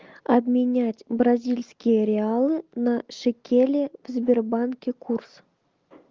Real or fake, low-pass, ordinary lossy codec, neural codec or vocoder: real; 7.2 kHz; Opus, 32 kbps; none